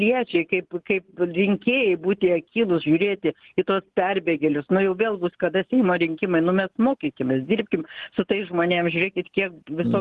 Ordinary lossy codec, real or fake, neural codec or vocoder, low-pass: Opus, 16 kbps; real; none; 10.8 kHz